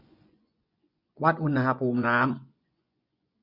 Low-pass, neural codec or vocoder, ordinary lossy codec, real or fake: 5.4 kHz; vocoder, 24 kHz, 100 mel bands, Vocos; none; fake